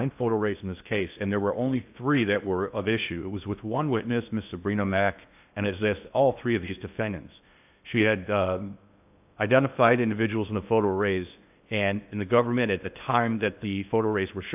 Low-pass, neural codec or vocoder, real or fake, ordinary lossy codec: 3.6 kHz; codec, 16 kHz in and 24 kHz out, 0.8 kbps, FocalCodec, streaming, 65536 codes; fake; AAC, 32 kbps